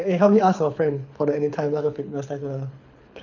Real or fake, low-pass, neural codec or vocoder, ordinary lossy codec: fake; 7.2 kHz; codec, 24 kHz, 6 kbps, HILCodec; none